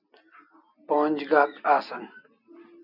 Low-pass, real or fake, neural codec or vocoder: 5.4 kHz; real; none